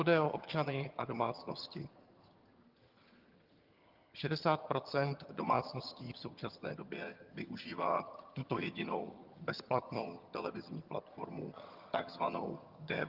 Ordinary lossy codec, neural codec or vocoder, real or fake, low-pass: Opus, 32 kbps; vocoder, 22.05 kHz, 80 mel bands, HiFi-GAN; fake; 5.4 kHz